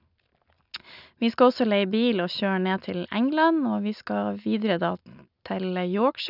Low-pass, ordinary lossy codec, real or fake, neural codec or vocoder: 5.4 kHz; none; real; none